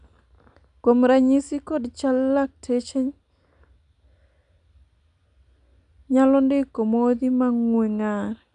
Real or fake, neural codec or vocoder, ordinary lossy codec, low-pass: real; none; none; 9.9 kHz